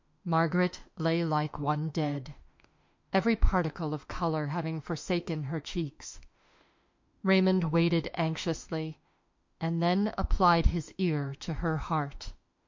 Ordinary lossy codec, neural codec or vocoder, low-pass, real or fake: MP3, 48 kbps; autoencoder, 48 kHz, 32 numbers a frame, DAC-VAE, trained on Japanese speech; 7.2 kHz; fake